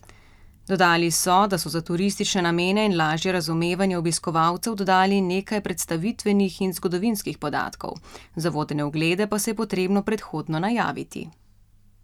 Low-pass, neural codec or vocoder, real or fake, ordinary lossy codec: 19.8 kHz; none; real; none